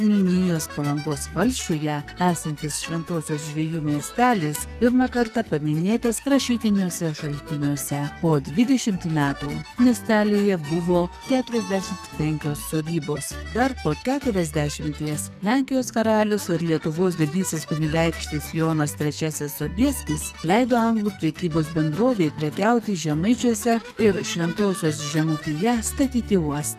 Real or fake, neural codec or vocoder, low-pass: fake; codec, 44.1 kHz, 2.6 kbps, SNAC; 14.4 kHz